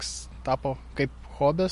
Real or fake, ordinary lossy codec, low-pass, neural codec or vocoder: real; MP3, 48 kbps; 14.4 kHz; none